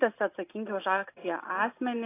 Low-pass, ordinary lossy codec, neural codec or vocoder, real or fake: 3.6 kHz; AAC, 24 kbps; none; real